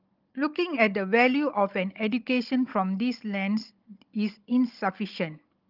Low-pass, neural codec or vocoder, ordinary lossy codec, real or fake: 5.4 kHz; codec, 16 kHz, 16 kbps, FunCodec, trained on Chinese and English, 50 frames a second; Opus, 32 kbps; fake